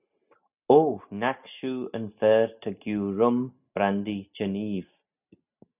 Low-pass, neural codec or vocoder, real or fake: 3.6 kHz; none; real